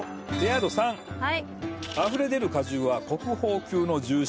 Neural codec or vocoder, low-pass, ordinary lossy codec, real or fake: none; none; none; real